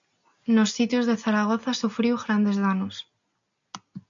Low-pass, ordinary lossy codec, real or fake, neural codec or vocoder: 7.2 kHz; MP3, 64 kbps; real; none